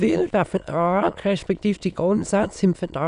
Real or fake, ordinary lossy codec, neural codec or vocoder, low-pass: fake; none; autoencoder, 22.05 kHz, a latent of 192 numbers a frame, VITS, trained on many speakers; 9.9 kHz